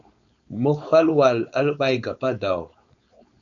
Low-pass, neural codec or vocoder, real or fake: 7.2 kHz; codec, 16 kHz, 4.8 kbps, FACodec; fake